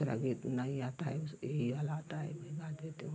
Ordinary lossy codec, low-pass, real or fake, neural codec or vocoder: none; none; real; none